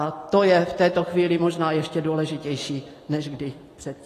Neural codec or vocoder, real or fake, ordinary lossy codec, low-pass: none; real; AAC, 48 kbps; 14.4 kHz